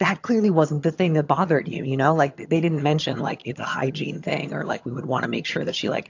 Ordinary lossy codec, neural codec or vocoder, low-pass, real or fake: AAC, 48 kbps; vocoder, 22.05 kHz, 80 mel bands, HiFi-GAN; 7.2 kHz; fake